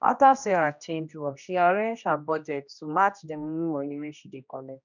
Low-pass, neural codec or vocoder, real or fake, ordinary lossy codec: 7.2 kHz; codec, 16 kHz, 1 kbps, X-Codec, HuBERT features, trained on general audio; fake; none